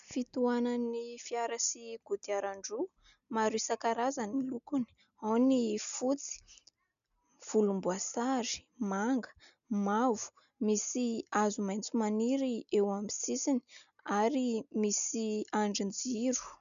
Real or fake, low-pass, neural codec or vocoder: real; 7.2 kHz; none